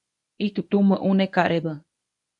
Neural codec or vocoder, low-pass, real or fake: codec, 24 kHz, 0.9 kbps, WavTokenizer, medium speech release version 1; 10.8 kHz; fake